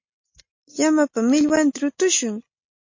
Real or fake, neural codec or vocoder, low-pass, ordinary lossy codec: real; none; 7.2 kHz; MP3, 32 kbps